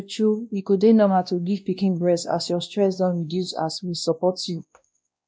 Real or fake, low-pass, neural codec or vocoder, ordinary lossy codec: fake; none; codec, 16 kHz, 1 kbps, X-Codec, WavLM features, trained on Multilingual LibriSpeech; none